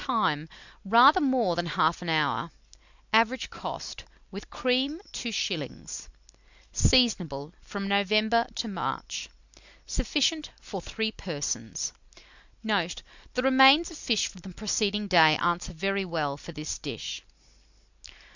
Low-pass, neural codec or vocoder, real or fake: 7.2 kHz; none; real